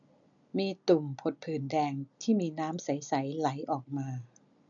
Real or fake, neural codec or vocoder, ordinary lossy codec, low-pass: real; none; none; 7.2 kHz